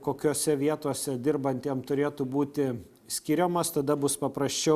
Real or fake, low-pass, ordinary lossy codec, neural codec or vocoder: real; 14.4 kHz; Opus, 64 kbps; none